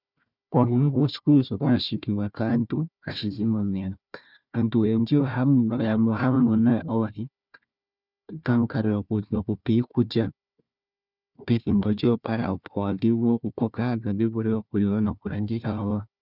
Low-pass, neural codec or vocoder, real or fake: 5.4 kHz; codec, 16 kHz, 1 kbps, FunCodec, trained on Chinese and English, 50 frames a second; fake